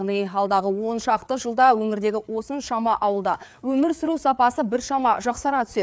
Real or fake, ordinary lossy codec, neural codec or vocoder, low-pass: fake; none; codec, 16 kHz, 4 kbps, FreqCodec, larger model; none